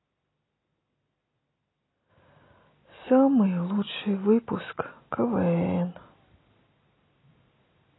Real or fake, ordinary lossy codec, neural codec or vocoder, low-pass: real; AAC, 16 kbps; none; 7.2 kHz